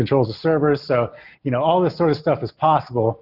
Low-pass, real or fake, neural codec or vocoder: 5.4 kHz; real; none